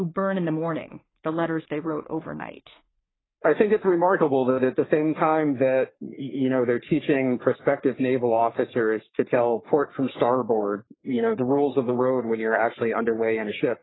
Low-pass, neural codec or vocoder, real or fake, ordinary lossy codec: 7.2 kHz; codec, 44.1 kHz, 3.4 kbps, Pupu-Codec; fake; AAC, 16 kbps